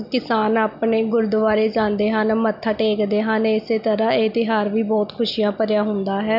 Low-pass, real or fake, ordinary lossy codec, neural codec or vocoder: 5.4 kHz; real; none; none